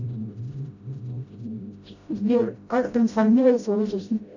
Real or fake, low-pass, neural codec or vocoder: fake; 7.2 kHz; codec, 16 kHz, 0.5 kbps, FreqCodec, smaller model